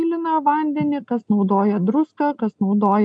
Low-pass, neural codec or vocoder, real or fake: 9.9 kHz; none; real